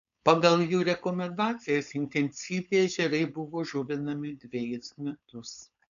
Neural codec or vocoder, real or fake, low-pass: codec, 16 kHz, 4.8 kbps, FACodec; fake; 7.2 kHz